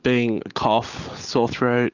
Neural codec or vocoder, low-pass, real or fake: none; 7.2 kHz; real